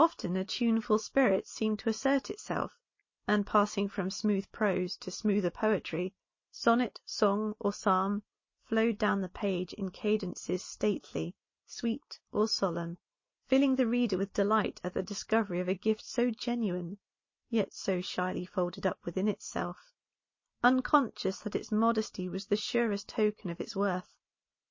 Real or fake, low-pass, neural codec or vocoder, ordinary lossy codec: real; 7.2 kHz; none; MP3, 32 kbps